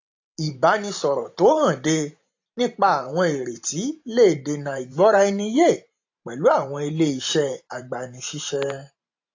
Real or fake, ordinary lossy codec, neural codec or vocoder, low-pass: fake; AAC, 48 kbps; vocoder, 44.1 kHz, 128 mel bands every 512 samples, BigVGAN v2; 7.2 kHz